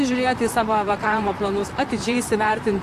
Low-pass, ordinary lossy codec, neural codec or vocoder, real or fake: 14.4 kHz; AAC, 64 kbps; vocoder, 44.1 kHz, 128 mel bands, Pupu-Vocoder; fake